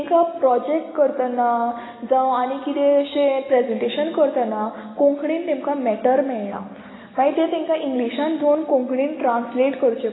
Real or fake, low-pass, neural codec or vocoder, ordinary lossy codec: real; 7.2 kHz; none; AAC, 16 kbps